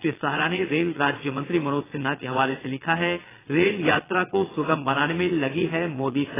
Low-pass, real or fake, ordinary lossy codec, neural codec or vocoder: 3.6 kHz; fake; AAC, 16 kbps; vocoder, 22.05 kHz, 80 mel bands, Vocos